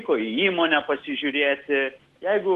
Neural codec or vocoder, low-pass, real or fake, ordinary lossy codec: none; 14.4 kHz; real; Opus, 16 kbps